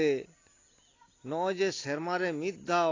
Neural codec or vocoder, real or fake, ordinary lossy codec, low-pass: none; real; AAC, 32 kbps; 7.2 kHz